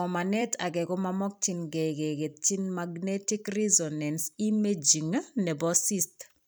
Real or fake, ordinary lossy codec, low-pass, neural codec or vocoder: fake; none; none; vocoder, 44.1 kHz, 128 mel bands every 256 samples, BigVGAN v2